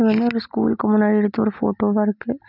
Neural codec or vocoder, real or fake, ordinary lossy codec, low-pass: none; real; none; 5.4 kHz